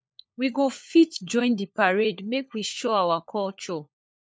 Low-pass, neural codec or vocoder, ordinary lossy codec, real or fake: none; codec, 16 kHz, 4 kbps, FunCodec, trained on LibriTTS, 50 frames a second; none; fake